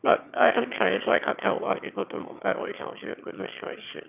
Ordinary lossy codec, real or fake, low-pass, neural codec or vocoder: none; fake; 3.6 kHz; autoencoder, 22.05 kHz, a latent of 192 numbers a frame, VITS, trained on one speaker